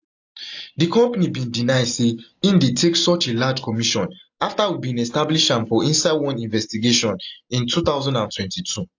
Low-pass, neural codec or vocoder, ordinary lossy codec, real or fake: 7.2 kHz; none; AAC, 48 kbps; real